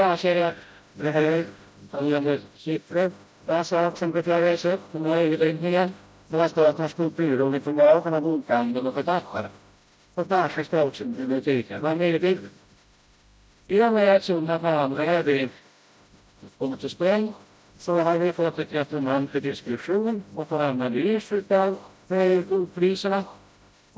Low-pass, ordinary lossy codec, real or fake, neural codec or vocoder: none; none; fake; codec, 16 kHz, 0.5 kbps, FreqCodec, smaller model